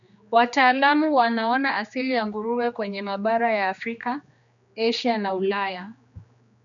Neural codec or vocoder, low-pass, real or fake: codec, 16 kHz, 2 kbps, X-Codec, HuBERT features, trained on general audio; 7.2 kHz; fake